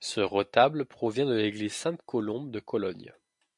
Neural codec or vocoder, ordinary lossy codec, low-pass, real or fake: none; MP3, 64 kbps; 10.8 kHz; real